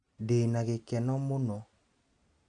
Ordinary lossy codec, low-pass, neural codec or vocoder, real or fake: none; 9.9 kHz; none; real